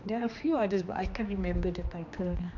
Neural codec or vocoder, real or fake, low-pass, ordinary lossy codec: codec, 16 kHz, 2 kbps, X-Codec, HuBERT features, trained on general audio; fake; 7.2 kHz; none